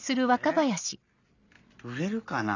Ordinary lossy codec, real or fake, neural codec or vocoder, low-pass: none; real; none; 7.2 kHz